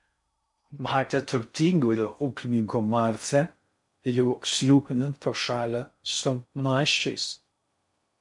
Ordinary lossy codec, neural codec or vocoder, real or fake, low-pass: MP3, 64 kbps; codec, 16 kHz in and 24 kHz out, 0.6 kbps, FocalCodec, streaming, 2048 codes; fake; 10.8 kHz